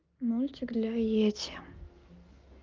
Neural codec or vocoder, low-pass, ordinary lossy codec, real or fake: none; 7.2 kHz; Opus, 24 kbps; real